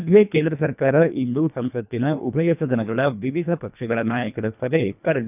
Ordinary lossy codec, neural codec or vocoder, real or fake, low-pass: none; codec, 24 kHz, 1.5 kbps, HILCodec; fake; 3.6 kHz